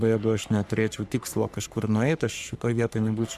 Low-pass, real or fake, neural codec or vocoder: 14.4 kHz; fake; codec, 44.1 kHz, 3.4 kbps, Pupu-Codec